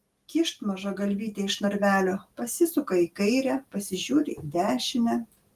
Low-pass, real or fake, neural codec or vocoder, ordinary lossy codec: 14.4 kHz; real; none; Opus, 24 kbps